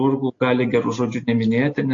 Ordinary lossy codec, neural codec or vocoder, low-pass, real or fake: AAC, 48 kbps; none; 7.2 kHz; real